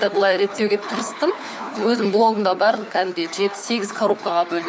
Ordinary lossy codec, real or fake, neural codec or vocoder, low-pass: none; fake; codec, 16 kHz, 4 kbps, FunCodec, trained on LibriTTS, 50 frames a second; none